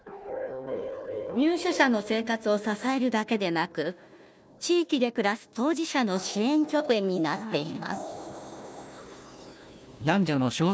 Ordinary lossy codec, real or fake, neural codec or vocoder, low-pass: none; fake; codec, 16 kHz, 1 kbps, FunCodec, trained on Chinese and English, 50 frames a second; none